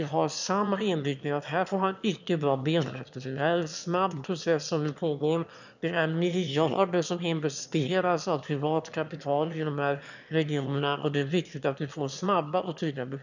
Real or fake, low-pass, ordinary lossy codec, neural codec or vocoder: fake; 7.2 kHz; none; autoencoder, 22.05 kHz, a latent of 192 numbers a frame, VITS, trained on one speaker